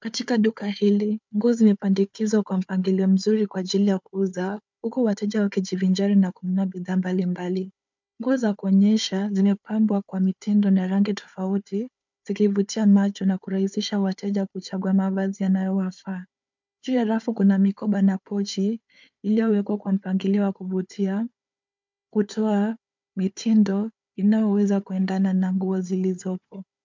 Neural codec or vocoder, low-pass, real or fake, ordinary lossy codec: codec, 16 kHz, 4 kbps, FunCodec, trained on Chinese and English, 50 frames a second; 7.2 kHz; fake; MP3, 64 kbps